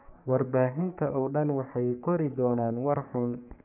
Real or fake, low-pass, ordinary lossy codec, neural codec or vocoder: fake; 3.6 kHz; none; codec, 44.1 kHz, 3.4 kbps, Pupu-Codec